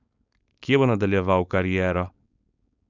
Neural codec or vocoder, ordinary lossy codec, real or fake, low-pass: codec, 16 kHz, 4.8 kbps, FACodec; none; fake; 7.2 kHz